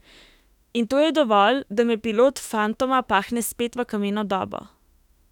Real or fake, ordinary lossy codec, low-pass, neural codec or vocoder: fake; none; 19.8 kHz; autoencoder, 48 kHz, 32 numbers a frame, DAC-VAE, trained on Japanese speech